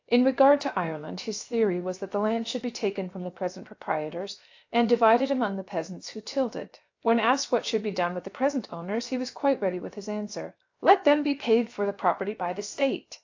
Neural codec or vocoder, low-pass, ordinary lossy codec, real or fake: codec, 16 kHz, 0.7 kbps, FocalCodec; 7.2 kHz; AAC, 48 kbps; fake